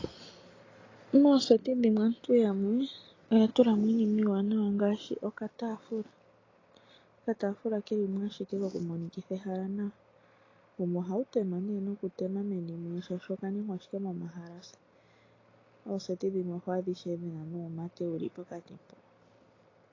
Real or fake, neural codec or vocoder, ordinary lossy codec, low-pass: real; none; AAC, 32 kbps; 7.2 kHz